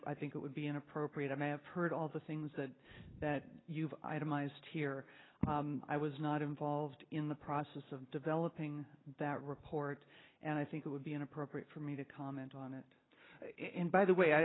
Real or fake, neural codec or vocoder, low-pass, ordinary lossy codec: real; none; 7.2 kHz; AAC, 16 kbps